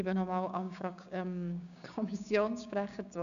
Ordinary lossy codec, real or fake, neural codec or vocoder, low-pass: none; fake; codec, 16 kHz, 6 kbps, DAC; 7.2 kHz